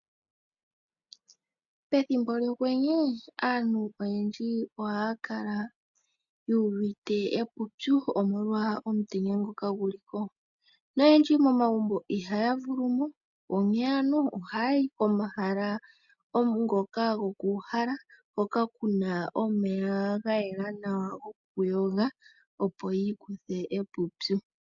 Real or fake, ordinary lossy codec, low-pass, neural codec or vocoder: real; MP3, 96 kbps; 7.2 kHz; none